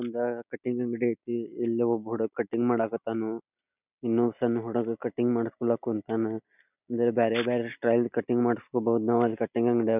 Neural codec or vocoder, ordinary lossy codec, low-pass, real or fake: none; none; 3.6 kHz; real